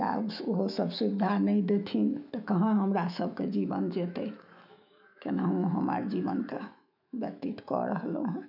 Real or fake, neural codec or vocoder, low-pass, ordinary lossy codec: fake; autoencoder, 48 kHz, 128 numbers a frame, DAC-VAE, trained on Japanese speech; 5.4 kHz; none